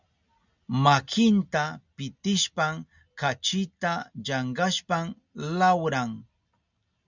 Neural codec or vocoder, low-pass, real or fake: none; 7.2 kHz; real